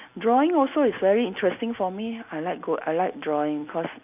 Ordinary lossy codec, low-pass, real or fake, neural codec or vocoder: none; 3.6 kHz; real; none